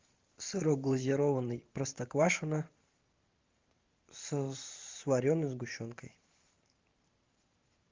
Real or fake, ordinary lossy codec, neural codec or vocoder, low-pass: fake; Opus, 32 kbps; vocoder, 44.1 kHz, 128 mel bands every 512 samples, BigVGAN v2; 7.2 kHz